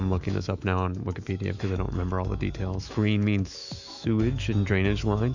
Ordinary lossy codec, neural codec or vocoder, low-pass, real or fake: Opus, 64 kbps; autoencoder, 48 kHz, 128 numbers a frame, DAC-VAE, trained on Japanese speech; 7.2 kHz; fake